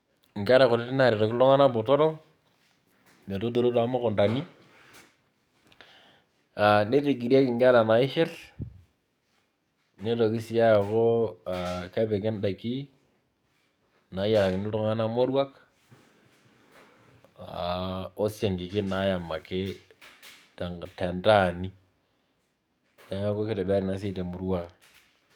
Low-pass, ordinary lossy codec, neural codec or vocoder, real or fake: 19.8 kHz; none; codec, 44.1 kHz, 7.8 kbps, DAC; fake